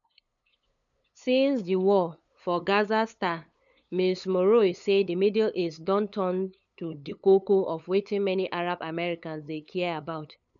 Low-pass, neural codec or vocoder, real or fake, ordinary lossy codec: 7.2 kHz; codec, 16 kHz, 8 kbps, FunCodec, trained on LibriTTS, 25 frames a second; fake; none